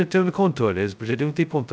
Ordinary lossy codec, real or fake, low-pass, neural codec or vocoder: none; fake; none; codec, 16 kHz, 0.2 kbps, FocalCodec